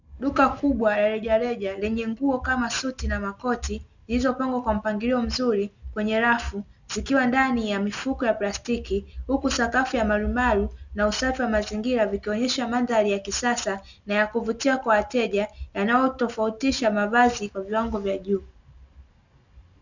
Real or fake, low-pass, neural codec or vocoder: real; 7.2 kHz; none